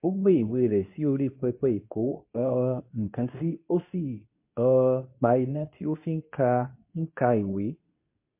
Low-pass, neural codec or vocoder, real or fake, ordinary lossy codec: 3.6 kHz; codec, 24 kHz, 0.9 kbps, WavTokenizer, medium speech release version 2; fake; MP3, 24 kbps